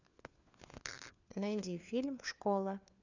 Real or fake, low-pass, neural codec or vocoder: fake; 7.2 kHz; codec, 16 kHz, 4 kbps, FunCodec, trained on LibriTTS, 50 frames a second